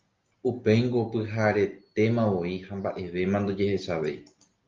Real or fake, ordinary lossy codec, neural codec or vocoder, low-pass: real; Opus, 24 kbps; none; 7.2 kHz